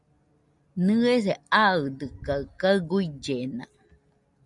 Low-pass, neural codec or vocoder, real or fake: 10.8 kHz; none; real